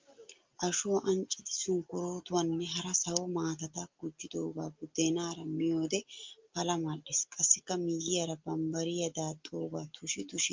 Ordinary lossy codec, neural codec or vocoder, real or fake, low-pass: Opus, 32 kbps; none; real; 7.2 kHz